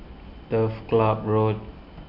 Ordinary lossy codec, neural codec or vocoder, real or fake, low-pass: none; none; real; 5.4 kHz